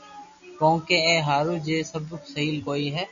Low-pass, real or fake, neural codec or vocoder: 7.2 kHz; real; none